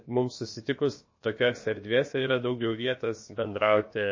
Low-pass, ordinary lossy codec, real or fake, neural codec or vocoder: 7.2 kHz; MP3, 32 kbps; fake; codec, 16 kHz, about 1 kbps, DyCAST, with the encoder's durations